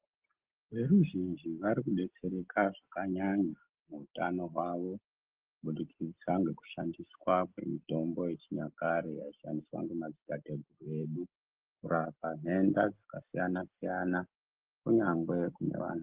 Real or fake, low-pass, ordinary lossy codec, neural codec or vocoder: real; 3.6 kHz; Opus, 16 kbps; none